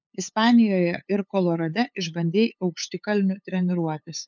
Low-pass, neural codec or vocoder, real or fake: 7.2 kHz; codec, 16 kHz, 8 kbps, FunCodec, trained on LibriTTS, 25 frames a second; fake